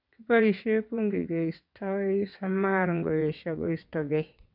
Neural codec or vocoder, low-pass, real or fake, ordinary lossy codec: vocoder, 22.05 kHz, 80 mel bands, WaveNeXt; 5.4 kHz; fake; none